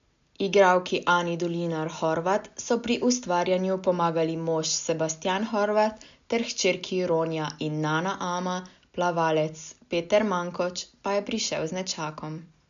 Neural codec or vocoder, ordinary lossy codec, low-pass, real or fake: none; MP3, 64 kbps; 7.2 kHz; real